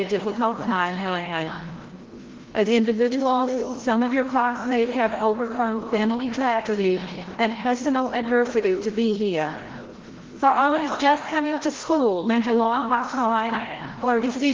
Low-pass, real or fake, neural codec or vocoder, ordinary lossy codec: 7.2 kHz; fake; codec, 16 kHz, 0.5 kbps, FreqCodec, larger model; Opus, 16 kbps